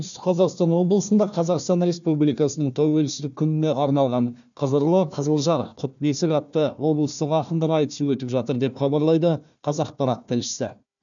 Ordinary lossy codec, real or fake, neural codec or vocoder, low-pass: none; fake; codec, 16 kHz, 1 kbps, FunCodec, trained on Chinese and English, 50 frames a second; 7.2 kHz